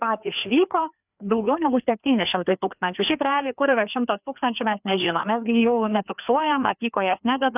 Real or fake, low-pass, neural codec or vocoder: fake; 3.6 kHz; codec, 16 kHz, 2 kbps, FreqCodec, larger model